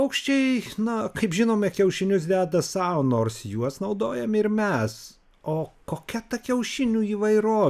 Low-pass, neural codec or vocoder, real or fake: 14.4 kHz; none; real